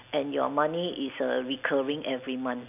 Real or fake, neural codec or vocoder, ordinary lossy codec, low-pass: real; none; none; 3.6 kHz